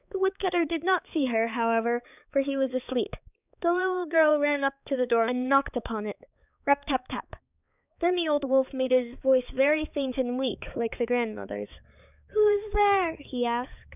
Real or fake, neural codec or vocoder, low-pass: fake; codec, 16 kHz, 4 kbps, X-Codec, HuBERT features, trained on balanced general audio; 3.6 kHz